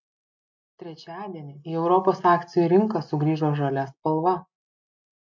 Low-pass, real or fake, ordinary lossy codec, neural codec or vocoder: 7.2 kHz; real; MP3, 48 kbps; none